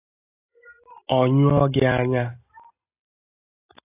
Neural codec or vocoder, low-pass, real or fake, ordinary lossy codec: none; 3.6 kHz; real; AAC, 24 kbps